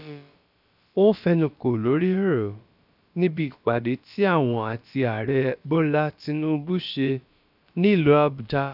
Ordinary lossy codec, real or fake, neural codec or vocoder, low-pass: none; fake; codec, 16 kHz, about 1 kbps, DyCAST, with the encoder's durations; 5.4 kHz